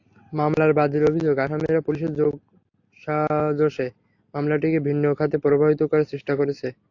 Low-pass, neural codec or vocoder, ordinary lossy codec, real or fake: 7.2 kHz; none; AAC, 48 kbps; real